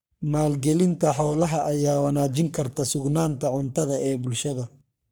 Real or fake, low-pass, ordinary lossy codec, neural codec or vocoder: fake; none; none; codec, 44.1 kHz, 3.4 kbps, Pupu-Codec